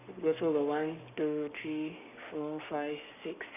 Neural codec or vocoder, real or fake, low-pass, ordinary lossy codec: codec, 44.1 kHz, 7.8 kbps, Pupu-Codec; fake; 3.6 kHz; none